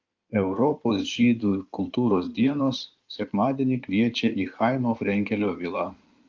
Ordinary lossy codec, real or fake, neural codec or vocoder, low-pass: Opus, 24 kbps; fake; codec, 16 kHz in and 24 kHz out, 2.2 kbps, FireRedTTS-2 codec; 7.2 kHz